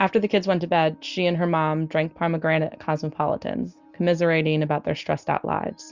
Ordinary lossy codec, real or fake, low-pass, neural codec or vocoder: Opus, 64 kbps; real; 7.2 kHz; none